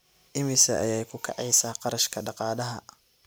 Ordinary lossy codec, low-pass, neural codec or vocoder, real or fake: none; none; none; real